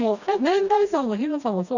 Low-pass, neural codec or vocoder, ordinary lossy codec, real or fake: 7.2 kHz; codec, 16 kHz, 1 kbps, FreqCodec, smaller model; none; fake